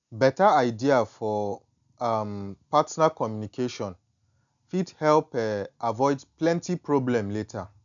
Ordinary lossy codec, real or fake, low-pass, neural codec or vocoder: none; real; 7.2 kHz; none